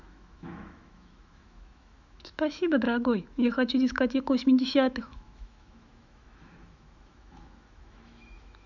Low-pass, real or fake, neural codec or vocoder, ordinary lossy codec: 7.2 kHz; fake; autoencoder, 48 kHz, 128 numbers a frame, DAC-VAE, trained on Japanese speech; none